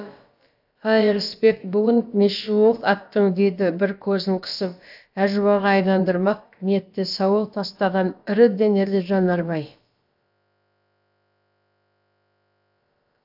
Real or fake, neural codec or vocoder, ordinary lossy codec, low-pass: fake; codec, 16 kHz, about 1 kbps, DyCAST, with the encoder's durations; AAC, 48 kbps; 5.4 kHz